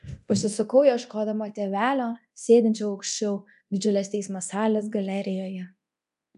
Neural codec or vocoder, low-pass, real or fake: codec, 24 kHz, 0.9 kbps, DualCodec; 10.8 kHz; fake